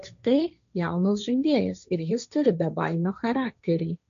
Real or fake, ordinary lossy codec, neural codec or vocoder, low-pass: fake; MP3, 96 kbps; codec, 16 kHz, 1.1 kbps, Voila-Tokenizer; 7.2 kHz